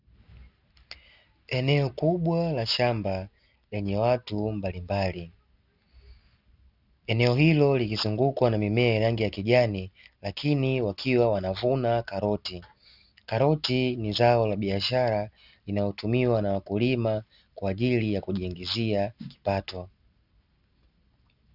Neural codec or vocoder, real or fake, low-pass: none; real; 5.4 kHz